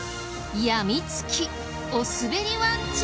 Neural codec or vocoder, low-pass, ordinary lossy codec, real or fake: none; none; none; real